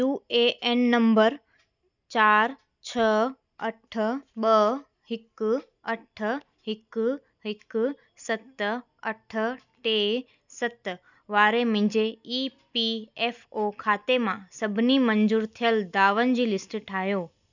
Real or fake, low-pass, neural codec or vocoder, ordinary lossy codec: real; 7.2 kHz; none; none